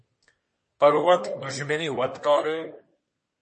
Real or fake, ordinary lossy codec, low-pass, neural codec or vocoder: fake; MP3, 32 kbps; 10.8 kHz; codec, 24 kHz, 1 kbps, SNAC